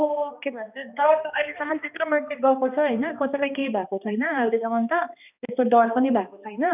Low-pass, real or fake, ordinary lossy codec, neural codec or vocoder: 3.6 kHz; fake; none; codec, 16 kHz, 2 kbps, X-Codec, HuBERT features, trained on balanced general audio